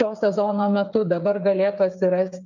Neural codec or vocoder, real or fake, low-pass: codec, 16 kHz, 8 kbps, FreqCodec, smaller model; fake; 7.2 kHz